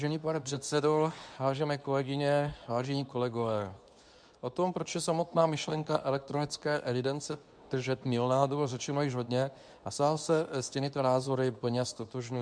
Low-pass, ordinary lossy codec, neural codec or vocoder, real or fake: 9.9 kHz; MP3, 96 kbps; codec, 24 kHz, 0.9 kbps, WavTokenizer, medium speech release version 2; fake